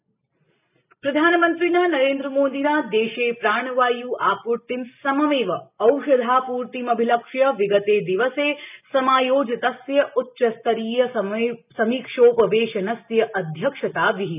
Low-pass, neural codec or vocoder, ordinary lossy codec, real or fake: 3.6 kHz; none; none; real